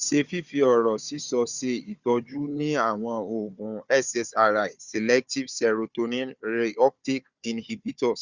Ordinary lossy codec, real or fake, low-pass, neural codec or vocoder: Opus, 64 kbps; fake; 7.2 kHz; codec, 16 kHz, 4 kbps, FunCodec, trained on Chinese and English, 50 frames a second